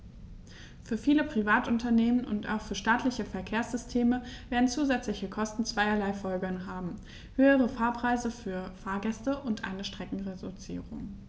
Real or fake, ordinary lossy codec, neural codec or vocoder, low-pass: real; none; none; none